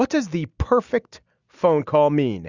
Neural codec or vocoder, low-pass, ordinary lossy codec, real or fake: none; 7.2 kHz; Opus, 64 kbps; real